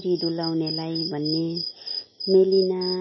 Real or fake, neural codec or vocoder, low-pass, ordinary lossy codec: real; none; 7.2 kHz; MP3, 24 kbps